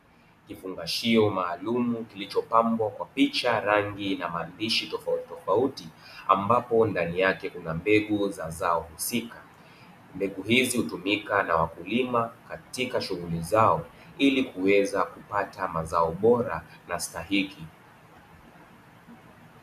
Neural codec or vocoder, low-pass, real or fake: none; 14.4 kHz; real